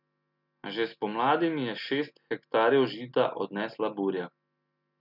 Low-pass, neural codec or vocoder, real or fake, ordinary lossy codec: 5.4 kHz; none; real; none